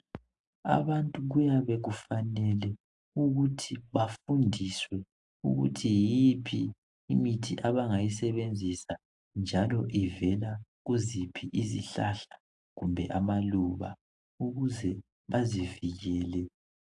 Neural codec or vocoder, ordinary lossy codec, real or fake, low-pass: none; AAC, 64 kbps; real; 10.8 kHz